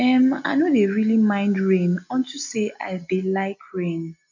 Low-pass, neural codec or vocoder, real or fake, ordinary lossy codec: 7.2 kHz; none; real; MP3, 48 kbps